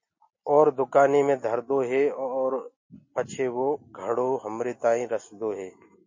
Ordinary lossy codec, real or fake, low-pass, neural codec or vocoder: MP3, 32 kbps; real; 7.2 kHz; none